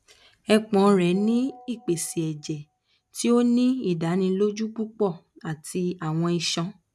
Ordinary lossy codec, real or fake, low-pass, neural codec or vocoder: none; real; none; none